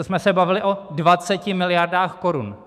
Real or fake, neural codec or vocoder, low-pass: fake; autoencoder, 48 kHz, 128 numbers a frame, DAC-VAE, trained on Japanese speech; 14.4 kHz